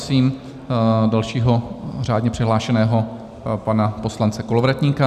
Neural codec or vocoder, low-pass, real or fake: none; 14.4 kHz; real